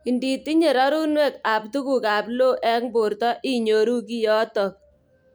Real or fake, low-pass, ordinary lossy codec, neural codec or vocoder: real; none; none; none